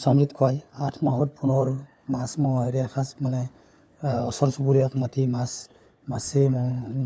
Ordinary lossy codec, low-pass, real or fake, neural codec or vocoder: none; none; fake; codec, 16 kHz, 4 kbps, FunCodec, trained on LibriTTS, 50 frames a second